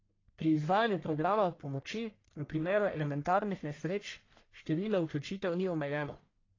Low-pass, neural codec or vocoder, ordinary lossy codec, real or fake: 7.2 kHz; codec, 44.1 kHz, 1.7 kbps, Pupu-Codec; AAC, 32 kbps; fake